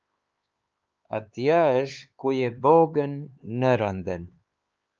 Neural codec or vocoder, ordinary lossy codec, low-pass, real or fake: codec, 16 kHz, 4 kbps, X-Codec, HuBERT features, trained on LibriSpeech; Opus, 24 kbps; 7.2 kHz; fake